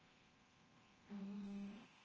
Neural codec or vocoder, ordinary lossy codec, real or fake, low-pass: codec, 24 kHz, 0.9 kbps, DualCodec; Opus, 24 kbps; fake; 7.2 kHz